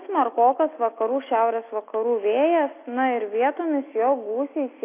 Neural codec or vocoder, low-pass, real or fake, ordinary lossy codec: none; 3.6 kHz; real; AAC, 24 kbps